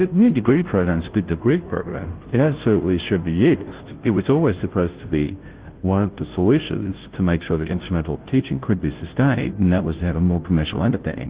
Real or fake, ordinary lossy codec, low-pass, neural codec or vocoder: fake; Opus, 16 kbps; 3.6 kHz; codec, 16 kHz, 0.5 kbps, FunCodec, trained on Chinese and English, 25 frames a second